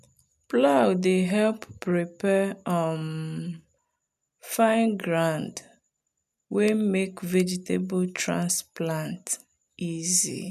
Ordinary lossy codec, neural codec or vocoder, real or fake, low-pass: none; none; real; 14.4 kHz